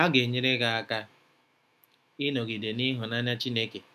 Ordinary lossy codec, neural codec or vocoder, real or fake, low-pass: none; autoencoder, 48 kHz, 128 numbers a frame, DAC-VAE, trained on Japanese speech; fake; 14.4 kHz